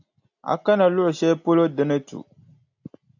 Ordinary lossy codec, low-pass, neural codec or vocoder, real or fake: AAC, 48 kbps; 7.2 kHz; none; real